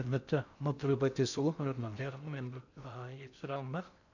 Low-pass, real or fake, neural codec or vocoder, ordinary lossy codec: 7.2 kHz; fake; codec, 16 kHz in and 24 kHz out, 0.6 kbps, FocalCodec, streaming, 4096 codes; none